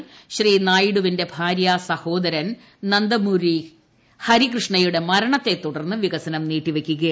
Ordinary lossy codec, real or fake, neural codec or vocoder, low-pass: none; real; none; none